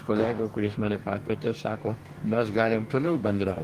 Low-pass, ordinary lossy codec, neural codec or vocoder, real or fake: 14.4 kHz; Opus, 24 kbps; codec, 44.1 kHz, 2.6 kbps, DAC; fake